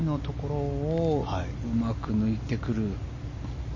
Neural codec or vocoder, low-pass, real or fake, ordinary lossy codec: none; 7.2 kHz; real; MP3, 32 kbps